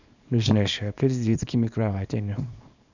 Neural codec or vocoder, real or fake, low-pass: codec, 24 kHz, 0.9 kbps, WavTokenizer, small release; fake; 7.2 kHz